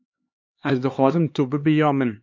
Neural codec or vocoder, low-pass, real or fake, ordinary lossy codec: codec, 16 kHz, 4 kbps, X-Codec, HuBERT features, trained on LibriSpeech; 7.2 kHz; fake; MP3, 48 kbps